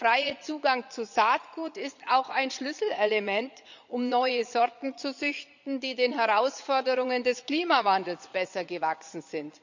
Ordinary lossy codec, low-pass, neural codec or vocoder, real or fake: none; 7.2 kHz; vocoder, 22.05 kHz, 80 mel bands, Vocos; fake